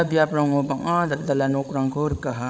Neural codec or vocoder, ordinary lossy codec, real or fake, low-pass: codec, 16 kHz, 16 kbps, FreqCodec, larger model; none; fake; none